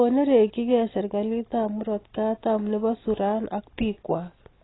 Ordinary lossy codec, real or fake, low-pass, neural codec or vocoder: AAC, 16 kbps; real; 7.2 kHz; none